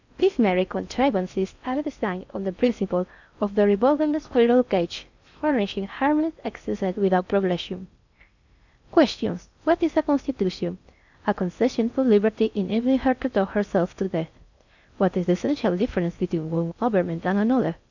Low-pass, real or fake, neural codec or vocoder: 7.2 kHz; fake; codec, 16 kHz in and 24 kHz out, 0.6 kbps, FocalCodec, streaming, 4096 codes